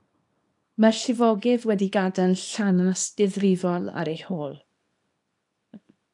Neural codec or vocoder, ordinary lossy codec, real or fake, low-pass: codec, 24 kHz, 0.9 kbps, WavTokenizer, small release; AAC, 64 kbps; fake; 10.8 kHz